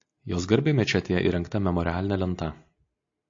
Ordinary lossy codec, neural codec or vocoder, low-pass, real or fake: AAC, 48 kbps; none; 7.2 kHz; real